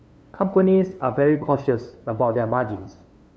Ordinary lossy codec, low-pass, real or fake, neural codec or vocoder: none; none; fake; codec, 16 kHz, 2 kbps, FunCodec, trained on LibriTTS, 25 frames a second